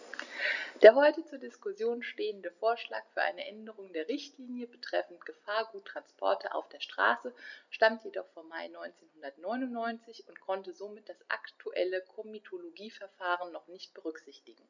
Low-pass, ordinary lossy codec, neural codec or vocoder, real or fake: 7.2 kHz; none; none; real